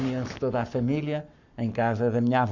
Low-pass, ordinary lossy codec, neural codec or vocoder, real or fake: 7.2 kHz; none; codec, 44.1 kHz, 7.8 kbps, Pupu-Codec; fake